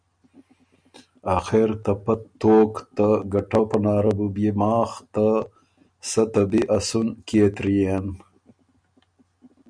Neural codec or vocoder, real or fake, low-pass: none; real; 9.9 kHz